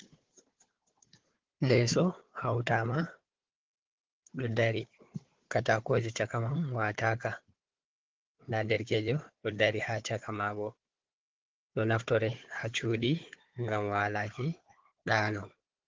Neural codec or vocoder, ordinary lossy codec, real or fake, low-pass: codec, 16 kHz, 4 kbps, FunCodec, trained on Chinese and English, 50 frames a second; Opus, 16 kbps; fake; 7.2 kHz